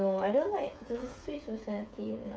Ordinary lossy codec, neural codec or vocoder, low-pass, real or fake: none; codec, 16 kHz, 8 kbps, FreqCodec, smaller model; none; fake